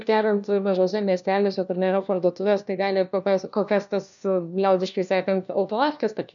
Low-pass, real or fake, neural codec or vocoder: 7.2 kHz; fake; codec, 16 kHz, 1 kbps, FunCodec, trained on LibriTTS, 50 frames a second